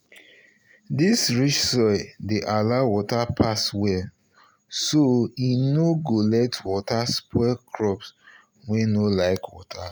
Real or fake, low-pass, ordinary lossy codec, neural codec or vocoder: real; none; none; none